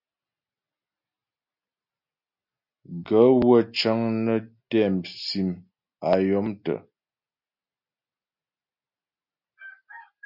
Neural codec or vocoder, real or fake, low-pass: none; real; 5.4 kHz